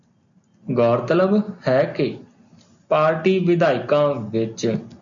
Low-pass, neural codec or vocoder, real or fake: 7.2 kHz; none; real